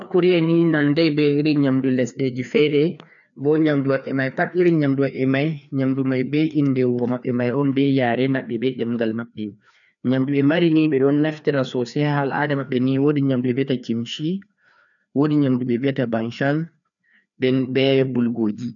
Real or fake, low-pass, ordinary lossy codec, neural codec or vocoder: fake; 7.2 kHz; none; codec, 16 kHz, 2 kbps, FreqCodec, larger model